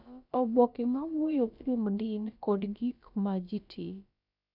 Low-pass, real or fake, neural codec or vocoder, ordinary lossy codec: 5.4 kHz; fake; codec, 16 kHz, about 1 kbps, DyCAST, with the encoder's durations; none